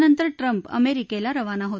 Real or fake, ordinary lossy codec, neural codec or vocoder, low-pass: real; none; none; none